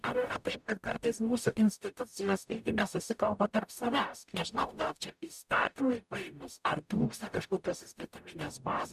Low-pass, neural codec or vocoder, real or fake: 14.4 kHz; codec, 44.1 kHz, 0.9 kbps, DAC; fake